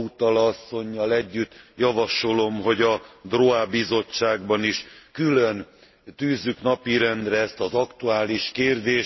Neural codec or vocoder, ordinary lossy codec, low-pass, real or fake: none; MP3, 24 kbps; 7.2 kHz; real